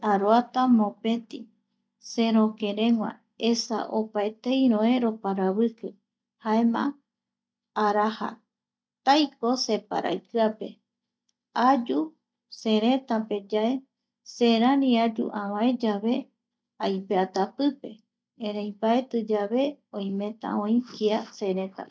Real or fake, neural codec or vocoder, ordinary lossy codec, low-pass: real; none; none; none